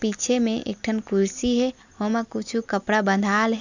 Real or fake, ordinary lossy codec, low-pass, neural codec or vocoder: real; none; 7.2 kHz; none